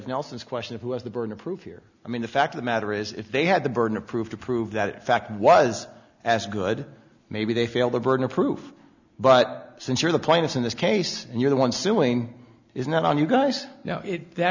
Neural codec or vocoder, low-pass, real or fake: none; 7.2 kHz; real